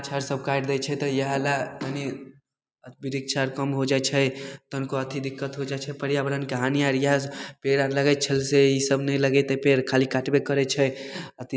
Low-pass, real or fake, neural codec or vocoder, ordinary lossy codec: none; real; none; none